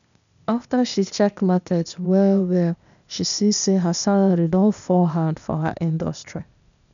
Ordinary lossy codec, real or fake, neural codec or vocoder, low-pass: none; fake; codec, 16 kHz, 0.8 kbps, ZipCodec; 7.2 kHz